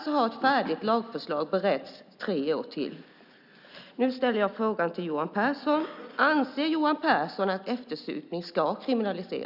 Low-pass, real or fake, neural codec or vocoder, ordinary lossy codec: 5.4 kHz; real; none; none